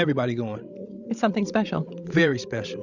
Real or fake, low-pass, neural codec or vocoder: fake; 7.2 kHz; codec, 16 kHz, 16 kbps, FreqCodec, larger model